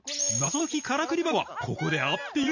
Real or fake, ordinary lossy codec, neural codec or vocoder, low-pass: real; none; none; 7.2 kHz